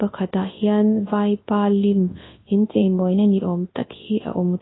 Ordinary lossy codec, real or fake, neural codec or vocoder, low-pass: AAC, 16 kbps; fake; codec, 24 kHz, 0.9 kbps, WavTokenizer, large speech release; 7.2 kHz